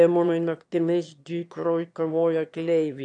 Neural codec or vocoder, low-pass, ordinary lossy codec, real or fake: autoencoder, 22.05 kHz, a latent of 192 numbers a frame, VITS, trained on one speaker; 9.9 kHz; AAC, 64 kbps; fake